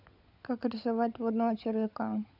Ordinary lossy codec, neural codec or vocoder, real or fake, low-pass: none; codec, 16 kHz, 16 kbps, FunCodec, trained on LibriTTS, 50 frames a second; fake; 5.4 kHz